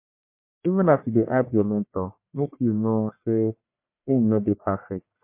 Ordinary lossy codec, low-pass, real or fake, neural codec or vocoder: MP3, 24 kbps; 3.6 kHz; fake; codec, 44.1 kHz, 1.7 kbps, Pupu-Codec